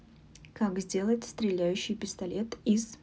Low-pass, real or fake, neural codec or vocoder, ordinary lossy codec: none; real; none; none